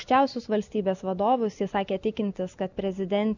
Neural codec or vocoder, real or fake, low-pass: none; real; 7.2 kHz